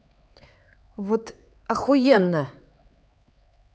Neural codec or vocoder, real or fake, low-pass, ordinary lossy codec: codec, 16 kHz, 4 kbps, X-Codec, HuBERT features, trained on LibriSpeech; fake; none; none